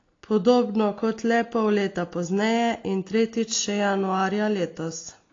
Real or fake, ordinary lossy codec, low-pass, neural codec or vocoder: real; AAC, 32 kbps; 7.2 kHz; none